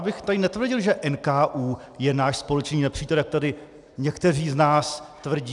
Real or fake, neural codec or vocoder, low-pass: real; none; 10.8 kHz